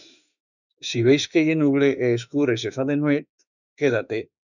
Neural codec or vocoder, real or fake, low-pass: autoencoder, 48 kHz, 32 numbers a frame, DAC-VAE, trained on Japanese speech; fake; 7.2 kHz